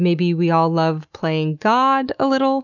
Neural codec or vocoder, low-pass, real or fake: none; 7.2 kHz; real